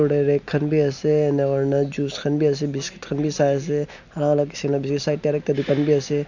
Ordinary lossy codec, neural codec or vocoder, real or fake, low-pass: none; none; real; 7.2 kHz